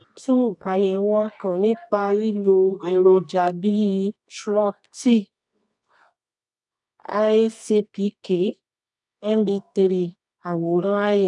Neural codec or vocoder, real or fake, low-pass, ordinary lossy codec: codec, 24 kHz, 0.9 kbps, WavTokenizer, medium music audio release; fake; 10.8 kHz; none